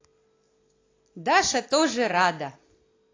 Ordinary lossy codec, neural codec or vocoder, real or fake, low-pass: AAC, 32 kbps; none; real; 7.2 kHz